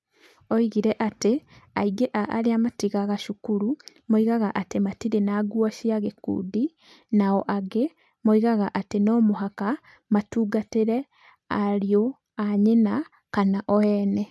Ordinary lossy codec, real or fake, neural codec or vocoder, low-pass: none; real; none; none